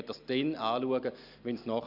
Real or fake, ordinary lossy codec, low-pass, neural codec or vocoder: real; none; 5.4 kHz; none